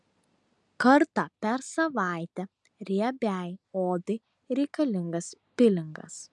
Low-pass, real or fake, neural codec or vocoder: 10.8 kHz; real; none